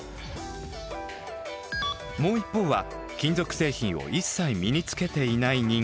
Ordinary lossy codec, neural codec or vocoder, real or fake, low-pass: none; none; real; none